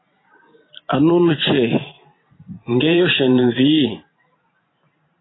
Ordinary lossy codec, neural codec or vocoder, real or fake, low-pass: AAC, 16 kbps; vocoder, 44.1 kHz, 128 mel bands every 512 samples, BigVGAN v2; fake; 7.2 kHz